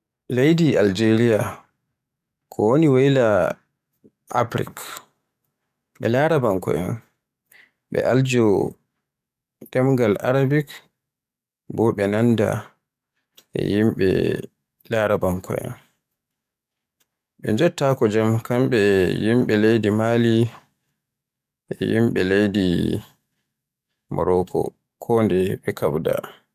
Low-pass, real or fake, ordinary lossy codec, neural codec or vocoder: 14.4 kHz; fake; none; codec, 44.1 kHz, 7.8 kbps, DAC